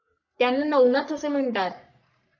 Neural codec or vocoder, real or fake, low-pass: codec, 44.1 kHz, 3.4 kbps, Pupu-Codec; fake; 7.2 kHz